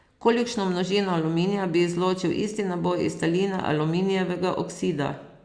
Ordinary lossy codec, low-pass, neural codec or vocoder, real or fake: Opus, 64 kbps; 9.9 kHz; none; real